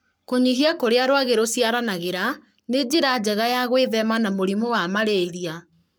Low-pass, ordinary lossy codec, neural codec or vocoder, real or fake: none; none; codec, 44.1 kHz, 7.8 kbps, Pupu-Codec; fake